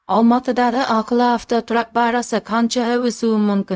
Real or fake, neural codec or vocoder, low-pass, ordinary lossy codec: fake; codec, 16 kHz, 0.4 kbps, LongCat-Audio-Codec; none; none